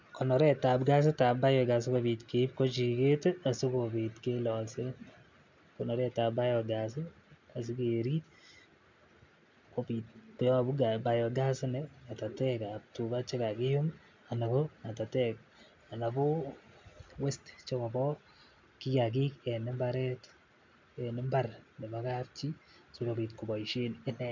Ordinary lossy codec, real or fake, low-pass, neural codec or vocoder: MP3, 64 kbps; real; 7.2 kHz; none